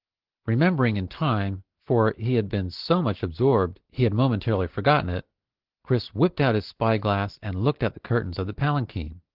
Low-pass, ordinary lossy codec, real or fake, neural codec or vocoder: 5.4 kHz; Opus, 16 kbps; real; none